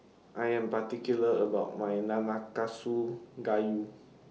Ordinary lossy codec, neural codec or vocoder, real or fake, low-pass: none; none; real; none